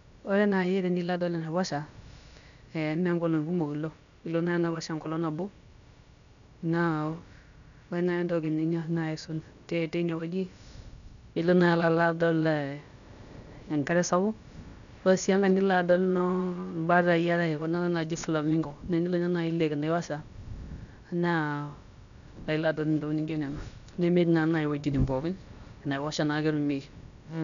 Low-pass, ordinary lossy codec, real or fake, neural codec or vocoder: 7.2 kHz; none; fake; codec, 16 kHz, about 1 kbps, DyCAST, with the encoder's durations